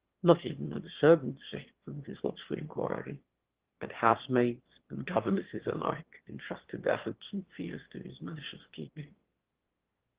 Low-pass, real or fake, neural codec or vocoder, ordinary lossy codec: 3.6 kHz; fake; autoencoder, 22.05 kHz, a latent of 192 numbers a frame, VITS, trained on one speaker; Opus, 16 kbps